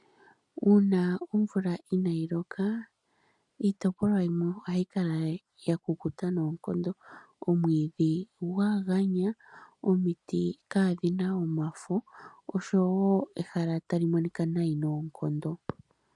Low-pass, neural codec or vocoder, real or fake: 10.8 kHz; none; real